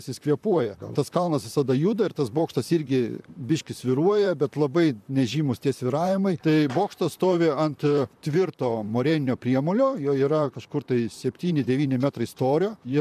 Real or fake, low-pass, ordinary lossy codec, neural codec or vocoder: fake; 14.4 kHz; MP3, 96 kbps; vocoder, 44.1 kHz, 128 mel bands, Pupu-Vocoder